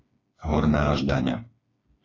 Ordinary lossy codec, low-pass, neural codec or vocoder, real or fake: AAC, 32 kbps; 7.2 kHz; codec, 16 kHz, 4 kbps, FreqCodec, smaller model; fake